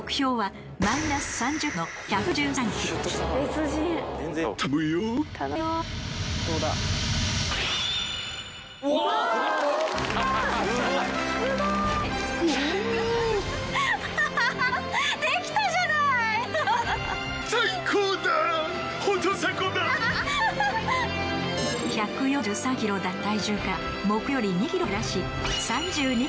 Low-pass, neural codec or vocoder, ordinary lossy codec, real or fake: none; none; none; real